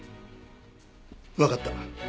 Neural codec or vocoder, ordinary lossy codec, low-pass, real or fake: none; none; none; real